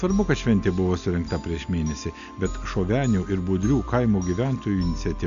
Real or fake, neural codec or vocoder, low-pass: real; none; 7.2 kHz